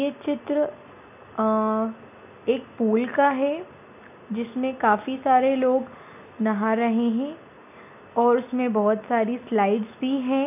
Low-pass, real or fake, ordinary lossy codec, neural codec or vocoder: 3.6 kHz; real; none; none